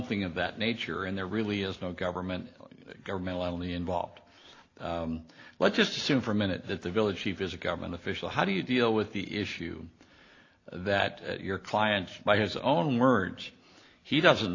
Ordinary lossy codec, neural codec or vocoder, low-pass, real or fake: AAC, 32 kbps; none; 7.2 kHz; real